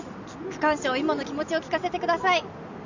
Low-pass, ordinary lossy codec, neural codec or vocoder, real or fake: 7.2 kHz; none; none; real